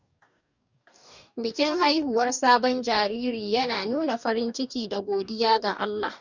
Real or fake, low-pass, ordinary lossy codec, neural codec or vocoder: fake; 7.2 kHz; none; codec, 44.1 kHz, 2.6 kbps, DAC